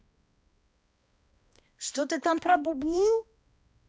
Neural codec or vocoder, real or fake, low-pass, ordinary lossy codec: codec, 16 kHz, 1 kbps, X-Codec, HuBERT features, trained on balanced general audio; fake; none; none